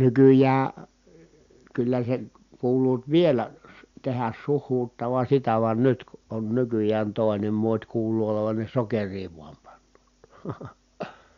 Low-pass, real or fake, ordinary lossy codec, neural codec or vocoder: 7.2 kHz; real; Opus, 64 kbps; none